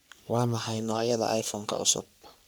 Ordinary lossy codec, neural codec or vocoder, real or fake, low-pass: none; codec, 44.1 kHz, 3.4 kbps, Pupu-Codec; fake; none